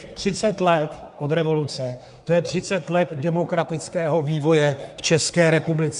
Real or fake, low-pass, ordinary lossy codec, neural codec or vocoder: fake; 10.8 kHz; AAC, 96 kbps; codec, 24 kHz, 1 kbps, SNAC